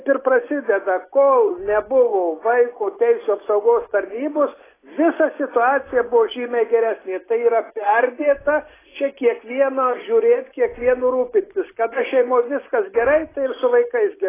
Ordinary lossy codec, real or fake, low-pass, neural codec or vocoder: AAC, 16 kbps; real; 3.6 kHz; none